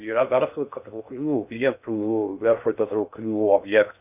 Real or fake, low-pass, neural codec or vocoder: fake; 3.6 kHz; codec, 16 kHz in and 24 kHz out, 0.6 kbps, FocalCodec, streaming, 2048 codes